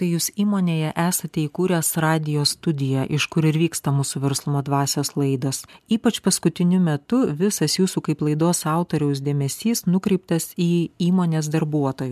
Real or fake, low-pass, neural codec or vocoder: real; 14.4 kHz; none